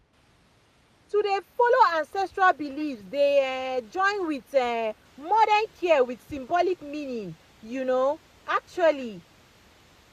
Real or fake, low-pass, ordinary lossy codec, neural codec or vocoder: real; 14.4 kHz; none; none